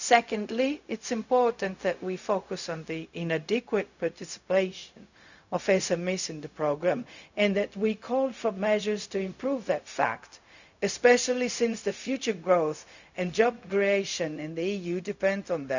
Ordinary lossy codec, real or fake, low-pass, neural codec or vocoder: none; fake; 7.2 kHz; codec, 16 kHz, 0.4 kbps, LongCat-Audio-Codec